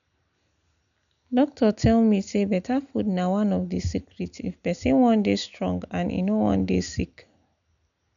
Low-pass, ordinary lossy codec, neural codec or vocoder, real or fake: 7.2 kHz; none; none; real